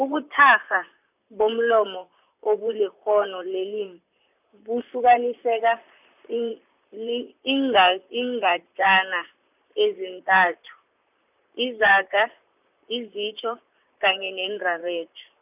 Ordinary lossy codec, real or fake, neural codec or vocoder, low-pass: none; fake; vocoder, 44.1 kHz, 128 mel bands, Pupu-Vocoder; 3.6 kHz